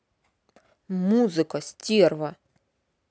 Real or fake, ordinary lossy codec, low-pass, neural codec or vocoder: real; none; none; none